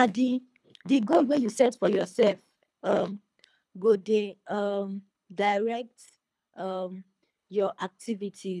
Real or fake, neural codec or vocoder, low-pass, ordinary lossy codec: fake; codec, 24 kHz, 3 kbps, HILCodec; none; none